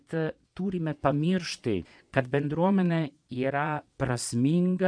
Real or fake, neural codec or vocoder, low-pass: fake; vocoder, 22.05 kHz, 80 mel bands, Vocos; 9.9 kHz